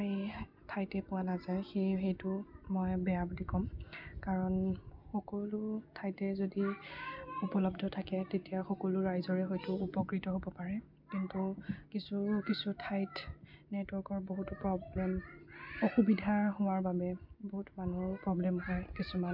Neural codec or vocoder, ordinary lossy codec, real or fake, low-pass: none; MP3, 48 kbps; real; 5.4 kHz